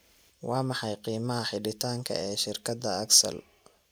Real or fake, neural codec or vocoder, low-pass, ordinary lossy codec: real; none; none; none